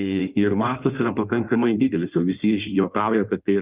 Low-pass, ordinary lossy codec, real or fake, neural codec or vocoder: 3.6 kHz; Opus, 24 kbps; fake; codec, 16 kHz in and 24 kHz out, 1.1 kbps, FireRedTTS-2 codec